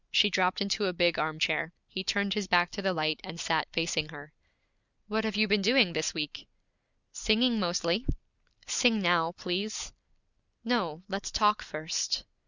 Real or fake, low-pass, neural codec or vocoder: real; 7.2 kHz; none